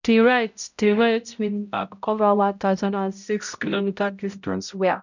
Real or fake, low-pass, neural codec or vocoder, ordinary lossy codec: fake; 7.2 kHz; codec, 16 kHz, 0.5 kbps, X-Codec, HuBERT features, trained on balanced general audio; none